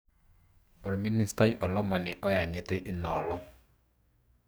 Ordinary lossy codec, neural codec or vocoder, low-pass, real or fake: none; codec, 44.1 kHz, 2.6 kbps, DAC; none; fake